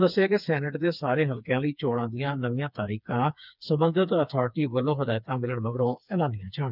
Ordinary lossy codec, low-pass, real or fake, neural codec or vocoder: none; 5.4 kHz; fake; codec, 16 kHz, 4 kbps, FreqCodec, smaller model